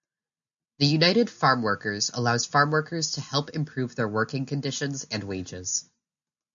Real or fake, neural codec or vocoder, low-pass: real; none; 7.2 kHz